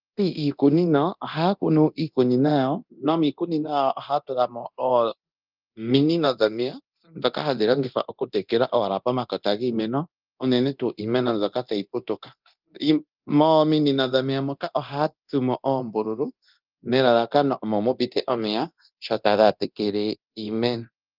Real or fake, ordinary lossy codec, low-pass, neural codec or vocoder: fake; Opus, 24 kbps; 5.4 kHz; codec, 24 kHz, 0.9 kbps, DualCodec